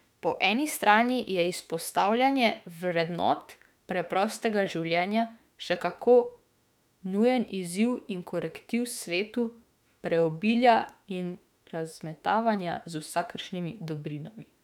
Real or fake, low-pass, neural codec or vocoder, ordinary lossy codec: fake; 19.8 kHz; autoencoder, 48 kHz, 32 numbers a frame, DAC-VAE, trained on Japanese speech; none